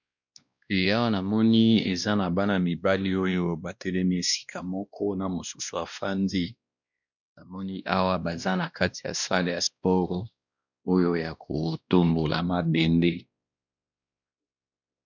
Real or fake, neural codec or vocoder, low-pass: fake; codec, 16 kHz, 1 kbps, X-Codec, WavLM features, trained on Multilingual LibriSpeech; 7.2 kHz